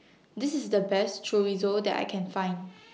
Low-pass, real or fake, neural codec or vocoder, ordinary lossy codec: none; real; none; none